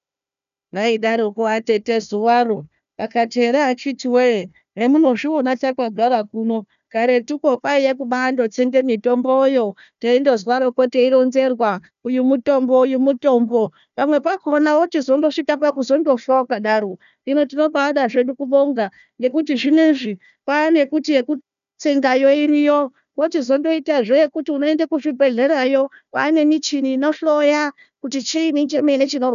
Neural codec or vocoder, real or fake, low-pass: codec, 16 kHz, 1 kbps, FunCodec, trained on Chinese and English, 50 frames a second; fake; 7.2 kHz